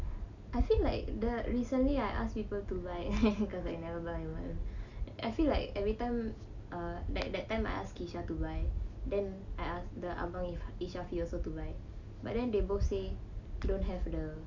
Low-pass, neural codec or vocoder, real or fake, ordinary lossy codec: 7.2 kHz; none; real; none